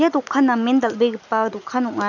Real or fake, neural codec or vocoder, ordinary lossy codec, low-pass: real; none; none; 7.2 kHz